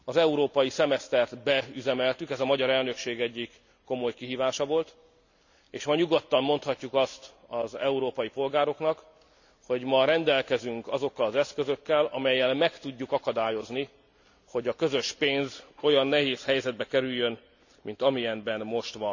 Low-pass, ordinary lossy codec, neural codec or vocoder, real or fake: 7.2 kHz; none; none; real